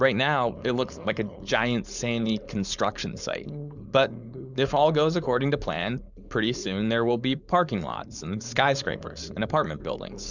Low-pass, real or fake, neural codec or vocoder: 7.2 kHz; fake; codec, 16 kHz, 4.8 kbps, FACodec